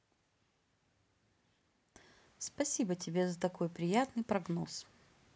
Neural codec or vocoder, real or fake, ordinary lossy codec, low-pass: none; real; none; none